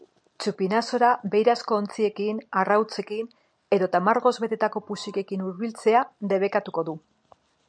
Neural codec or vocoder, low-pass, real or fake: none; 9.9 kHz; real